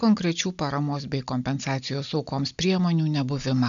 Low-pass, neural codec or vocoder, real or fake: 7.2 kHz; none; real